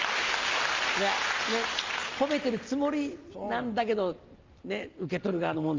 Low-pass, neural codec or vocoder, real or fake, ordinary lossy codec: 7.2 kHz; none; real; Opus, 32 kbps